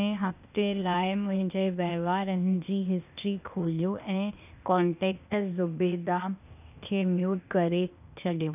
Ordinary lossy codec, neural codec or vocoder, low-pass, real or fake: none; codec, 16 kHz, 0.8 kbps, ZipCodec; 3.6 kHz; fake